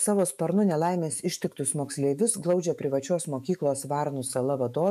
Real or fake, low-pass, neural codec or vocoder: fake; 14.4 kHz; autoencoder, 48 kHz, 128 numbers a frame, DAC-VAE, trained on Japanese speech